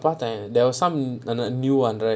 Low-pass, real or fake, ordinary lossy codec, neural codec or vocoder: none; real; none; none